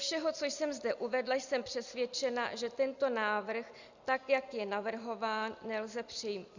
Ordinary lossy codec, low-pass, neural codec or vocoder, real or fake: Opus, 64 kbps; 7.2 kHz; none; real